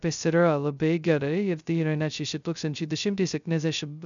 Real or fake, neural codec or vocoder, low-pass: fake; codec, 16 kHz, 0.2 kbps, FocalCodec; 7.2 kHz